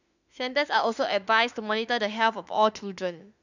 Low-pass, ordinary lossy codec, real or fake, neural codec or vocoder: 7.2 kHz; none; fake; autoencoder, 48 kHz, 32 numbers a frame, DAC-VAE, trained on Japanese speech